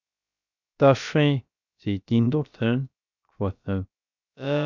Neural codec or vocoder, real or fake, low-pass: codec, 16 kHz, 0.3 kbps, FocalCodec; fake; 7.2 kHz